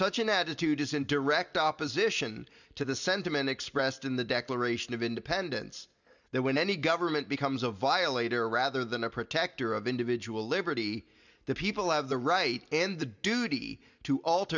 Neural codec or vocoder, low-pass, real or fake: none; 7.2 kHz; real